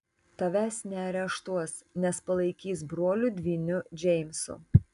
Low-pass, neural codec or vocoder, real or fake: 10.8 kHz; none; real